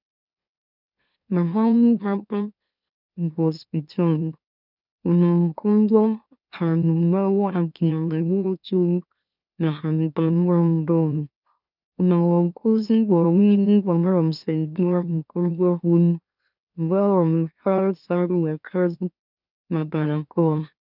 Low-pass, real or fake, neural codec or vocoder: 5.4 kHz; fake; autoencoder, 44.1 kHz, a latent of 192 numbers a frame, MeloTTS